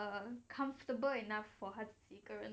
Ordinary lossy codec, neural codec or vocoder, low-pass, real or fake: none; none; none; real